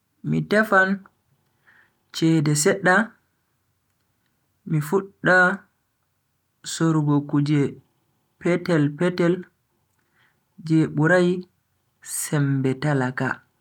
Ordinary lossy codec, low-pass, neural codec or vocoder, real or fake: none; 19.8 kHz; none; real